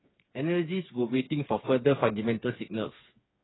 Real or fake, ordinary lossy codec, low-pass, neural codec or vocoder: fake; AAC, 16 kbps; 7.2 kHz; codec, 16 kHz, 4 kbps, FreqCodec, smaller model